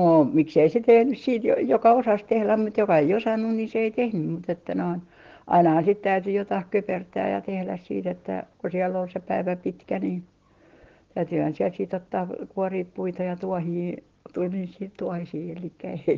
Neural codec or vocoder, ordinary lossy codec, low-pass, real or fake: none; Opus, 16 kbps; 7.2 kHz; real